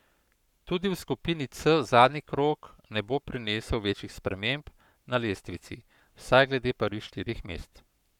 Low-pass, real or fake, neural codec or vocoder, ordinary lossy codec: 19.8 kHz; fake; codec, 44.1 kHz, 7.8 kbps, Pupu-Codec; none